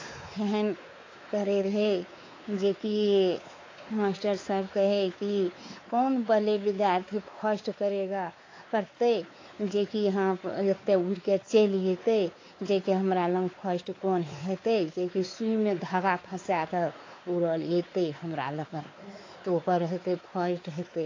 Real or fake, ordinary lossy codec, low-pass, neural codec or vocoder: fake; AAC, 32 kbps; 7.2 kHz; codec, 16 kHz, 4 kbps, X-Codec, WavLM features, trained on Multilingual LibriSpeech